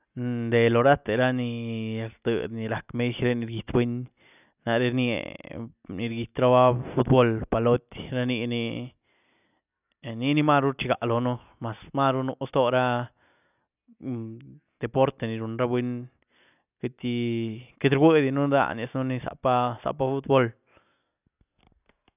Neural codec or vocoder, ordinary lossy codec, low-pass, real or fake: none; none; 3.6 kHz; real